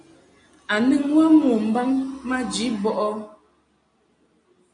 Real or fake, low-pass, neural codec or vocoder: real; 9.9 kHz; none